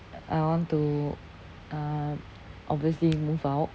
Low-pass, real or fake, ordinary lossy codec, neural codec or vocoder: none; real; none; none